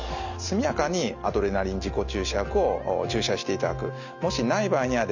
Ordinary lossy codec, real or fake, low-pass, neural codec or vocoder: none; real; 7.2 kHz; none